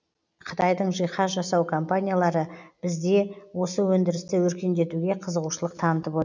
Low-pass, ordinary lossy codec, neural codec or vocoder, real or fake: 7.2 kHz; none; none; real